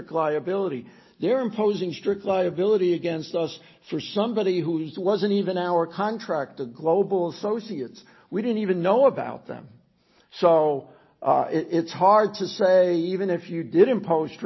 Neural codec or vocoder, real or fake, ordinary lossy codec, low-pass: none; real; MP3, 24 kbps; 7.2 kHz